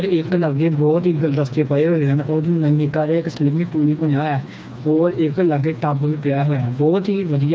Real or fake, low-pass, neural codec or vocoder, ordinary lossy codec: fake; none; codec, 16 kHz, 2 kbps, FreqCodec, smaller model; none